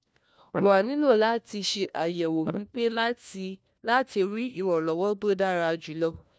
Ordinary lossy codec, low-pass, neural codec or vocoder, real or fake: none; none; codec, 16 kHz, 1 kbps, FunCodec, trained on LibriTTS, 50 frames a second; fake